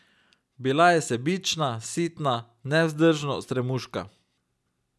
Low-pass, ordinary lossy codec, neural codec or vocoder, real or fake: none; none; none; real